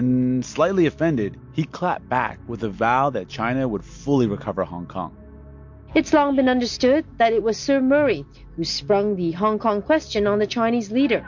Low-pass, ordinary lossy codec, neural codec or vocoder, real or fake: 7.2 kHz; MP3, 48 kbps; none; real